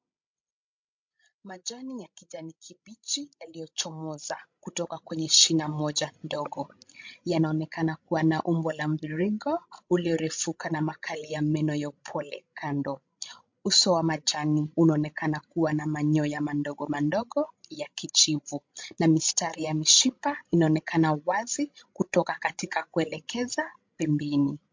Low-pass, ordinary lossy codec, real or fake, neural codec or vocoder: 7.2 kHz; MP3, 48 kbps; fake; codec, 16 kHz, 16 kbps, FreqCodec, larger model